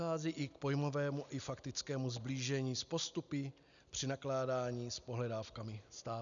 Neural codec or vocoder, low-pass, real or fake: none; 7.2 kHz; real